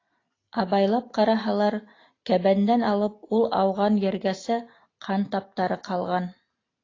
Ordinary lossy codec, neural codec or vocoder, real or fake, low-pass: AAC, 32 kbps; none; real; 7.2 kHz